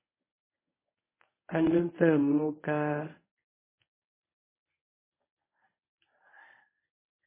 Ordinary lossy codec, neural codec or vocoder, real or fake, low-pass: MP3, 16 kbps; codec, 24 kHz, 0.9 kbps, WavTokenizer, medium speech release version 1; fake; 3.6 kHz